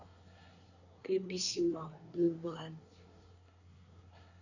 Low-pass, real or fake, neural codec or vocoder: 7.2 kHz; fake; codec, 24 kHz, 1 kbps, SNAC